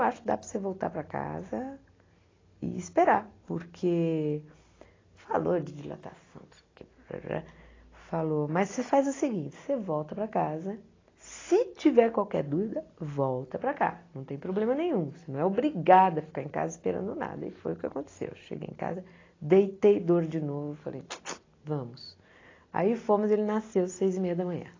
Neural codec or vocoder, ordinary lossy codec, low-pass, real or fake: none; AAC, 32 kbps; 7.2 kHz; real